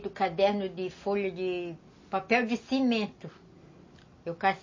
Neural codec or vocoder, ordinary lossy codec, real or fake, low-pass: none; MP3, 32 kbps; real; 7.2 kHz